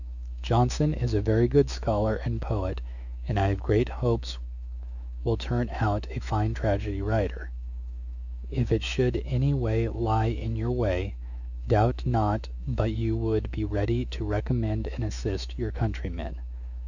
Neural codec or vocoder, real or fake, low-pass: autoencoder, 48 kHz, 128 numbers a frame, DAC-VAE, trained on Japanese speech; fake; 7.2 kHz